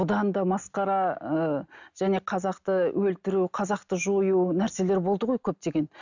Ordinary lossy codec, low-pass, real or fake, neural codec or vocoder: none; 7.2 kHz; real; none